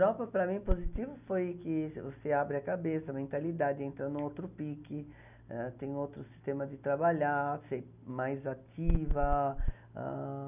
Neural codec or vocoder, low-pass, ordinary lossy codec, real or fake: none; 3.6 kHz; none; real